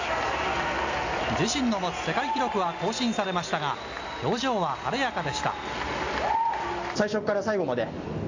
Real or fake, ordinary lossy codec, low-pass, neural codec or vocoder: real; AAC, 48 kbps; 7.2 kHz; none